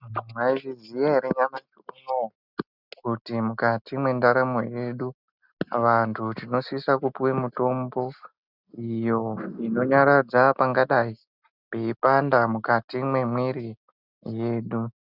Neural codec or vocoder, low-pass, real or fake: none; 5.4 kHz; real